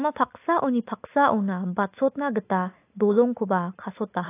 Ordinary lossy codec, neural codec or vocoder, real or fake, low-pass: AAC, 24 kbps; none; real; 3.6 kHz